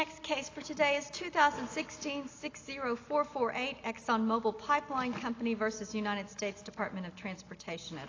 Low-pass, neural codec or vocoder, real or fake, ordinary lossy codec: 7.2 kHz; none; real; AAC, 32 kbps